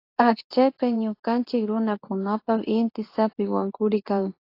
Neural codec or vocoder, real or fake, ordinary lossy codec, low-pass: codec, 24 kHz, 0.9 kbps, WavTokenizer, medium speech release version 2; fake; AAC, 32 kbps; 5.4 kHz